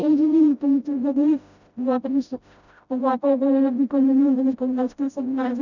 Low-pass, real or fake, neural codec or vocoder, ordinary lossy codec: 7.2 kHz; fake; codec, 16 kHz, 0.5 kbps, FreqCodec, smaller model; none